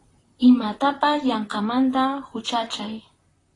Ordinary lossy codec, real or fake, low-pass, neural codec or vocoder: AAC, 32 kbps; fake; 10.8 kHz; vocoder, 44.1 kHz, 128 mel bands, Pupu-Vocoder